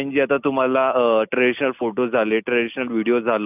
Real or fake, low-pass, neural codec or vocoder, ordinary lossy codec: real; 3.6 kHz; none; none